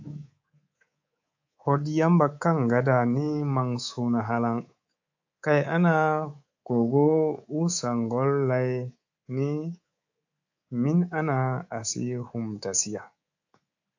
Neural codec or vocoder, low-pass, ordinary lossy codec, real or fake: codec, 24 kHz, 3.1 kbps, DualCodec; 7.2 kHz; AAC, 48 kbps; fake